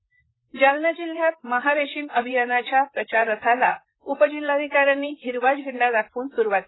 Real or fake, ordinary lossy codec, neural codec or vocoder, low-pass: fake; AAC, 16 kbps; vocoder, 44.1 kHz, 128 mel bands, Pupu-Vocoder; 7.2 kHz